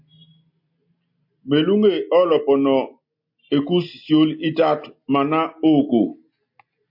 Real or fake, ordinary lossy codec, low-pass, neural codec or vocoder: real; MP3, 32 kbps; 5.4 kHz; none